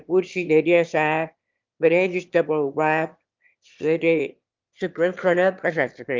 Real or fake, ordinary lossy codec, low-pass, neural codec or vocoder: fake; Opus, 24 kbps; 7.2 kHz; autoencoder, 22.05 kHz, a latent of 192 numbers a frame, VITS, trained on one speaker